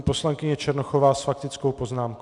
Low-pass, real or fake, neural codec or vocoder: 10.8 kHz; real; none